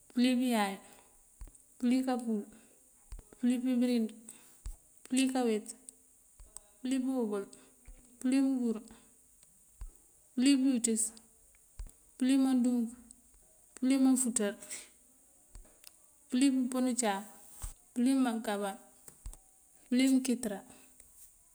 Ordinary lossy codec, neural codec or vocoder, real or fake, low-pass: none; none; real; none